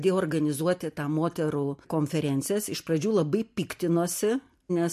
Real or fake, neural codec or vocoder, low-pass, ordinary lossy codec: real; none; 14.4 kHz; MP3, 64 kbps